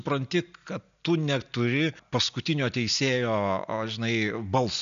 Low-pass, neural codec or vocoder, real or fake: 7.2 kHz; none; real